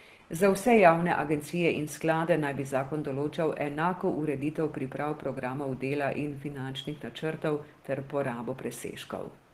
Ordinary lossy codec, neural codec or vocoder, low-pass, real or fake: Opus, 16 kbps; none; 14.4 kHz; real